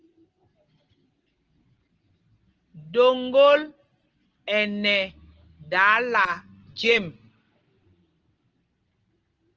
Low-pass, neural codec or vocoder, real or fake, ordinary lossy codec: 7.2 kHz; none; real; Opus, 16 kbps